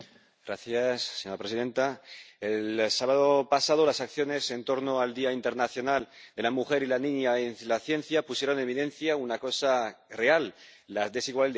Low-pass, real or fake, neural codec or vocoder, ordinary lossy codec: none; real; none; none